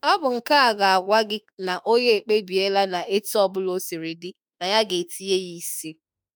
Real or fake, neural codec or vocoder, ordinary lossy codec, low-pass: fake; autoencoder, 48 kHz, 32 numbers a frame, DAC-VAE, trained on Japanese speech; none; none